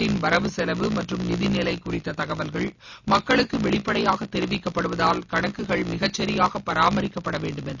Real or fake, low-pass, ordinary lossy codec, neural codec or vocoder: real; 7.2 kHz; none; none